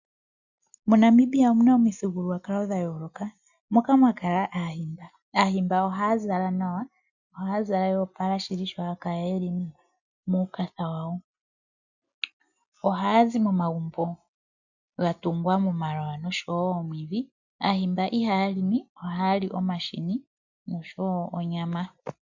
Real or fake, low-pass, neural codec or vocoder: real; 7.2 kHz; none